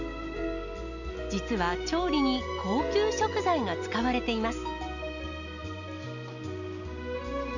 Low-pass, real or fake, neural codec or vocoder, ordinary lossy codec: 7.2 kHz; real; none; none